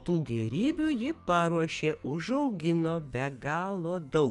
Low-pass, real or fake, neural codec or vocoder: 10.8 kHz; fake; codec, 44.1 kHz, 2.6 kbps, SNAC